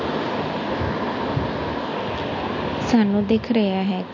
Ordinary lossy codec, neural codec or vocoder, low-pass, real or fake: MP3, 48 kbps; none; 7.2 kHz; real